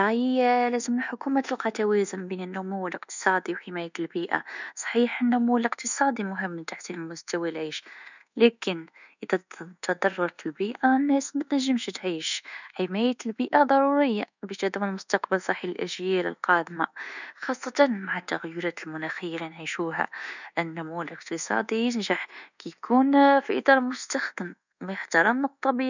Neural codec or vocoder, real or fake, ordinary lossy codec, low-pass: codec, 24 kHz, 1.2 kbps, DualCodec; fake; none; 7.2 kHz